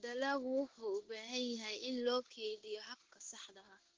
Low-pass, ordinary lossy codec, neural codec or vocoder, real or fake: 7.2 kHz; Opus, 16 kbps; codec, 16 kHz in and 24 kHz out, 1 kbps, XY-Tokenizer; fake